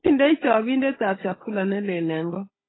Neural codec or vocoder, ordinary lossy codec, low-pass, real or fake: codec, 16 kHz, 16 kbps, FunCodec, trained on Chinese and English, 50 frames a second; AAC, 16 kbps; 7.2 kHz; fake